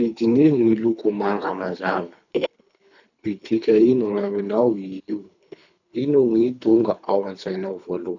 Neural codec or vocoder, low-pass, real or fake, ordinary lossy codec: codec, 24 kHz, 3 kbps, HILCodec; 7.2 kHz; fake; none